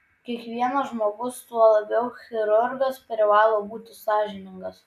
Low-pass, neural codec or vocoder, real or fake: 14.4 kHz; none; real